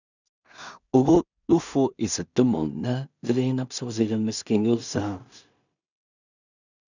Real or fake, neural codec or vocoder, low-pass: fake; codec, 16 kHz in and 24 kHz out, 0.4 kbps, LongCat-Audio-Codec, two codebook decoder; 7.2 kHz